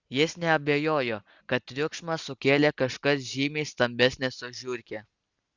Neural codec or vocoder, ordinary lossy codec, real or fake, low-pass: none; Opus, 64 kbps; real; 7.2 kHz